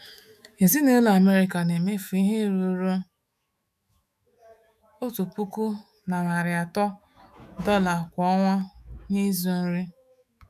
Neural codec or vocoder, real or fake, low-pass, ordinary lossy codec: autoencoder, 48 kHz, 128 numbers a frame, DAC-VAE, trained on Japanese speech; fake; 14.4 kHz; none